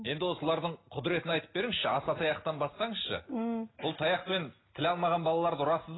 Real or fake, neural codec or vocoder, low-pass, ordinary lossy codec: real; none; 7.2 kHz; AAC, 16 kbps